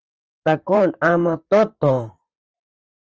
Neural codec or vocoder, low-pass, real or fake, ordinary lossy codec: vocoder, 44.1 kHz, 128 mel bands, Pupu-Vocoder; 7.2 kHz; fake; Opus, 24 kbps